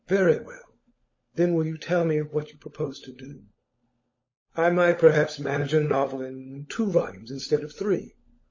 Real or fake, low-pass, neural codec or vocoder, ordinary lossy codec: fake; 7.2 kHz; codec, 16 kHz, 16 kbps, FunCodec, trained on LibriTTS, 50 frames a second; MP3, 32 kbps